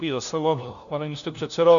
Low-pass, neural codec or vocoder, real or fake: 7.2 kHz; codec, 16 kHz, 1 kbps, FunCodec, trained on LibriTTS, 50 frames a second; fake